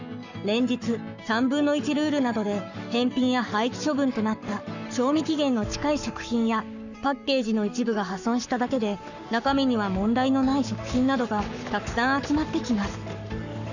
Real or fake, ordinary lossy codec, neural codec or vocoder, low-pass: fake; none; codec, 44.1 kHz, 7.8 kbps, Pupu-Codec; 7.2 kHz